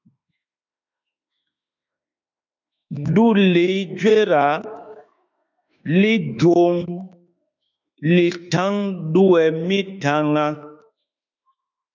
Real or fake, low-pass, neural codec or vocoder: fake; 7.2 kHz; autoencoder, 48 kHz, 32 numbers a frame, DAC-VAE, trained on Japanese speech